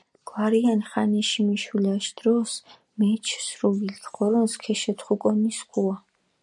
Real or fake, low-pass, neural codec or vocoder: real; 10.8 kHz; none